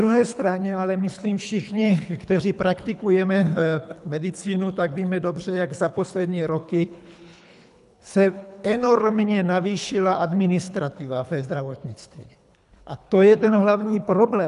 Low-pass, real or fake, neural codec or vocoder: 10.8 kHz; fake; codec, 24 kHz, 3 kbps, HILCodec